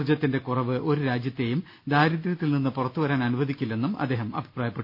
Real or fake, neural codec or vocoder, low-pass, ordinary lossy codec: real; none; 5.4 kHz; none